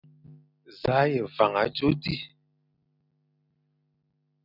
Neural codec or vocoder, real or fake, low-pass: none; real; 5.4 kHz